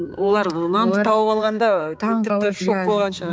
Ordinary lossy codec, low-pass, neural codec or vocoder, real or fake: none; none; codec, 16 kHz, 4 kbps, X-Codec, HuBERT features, trained on balanced general audio; fake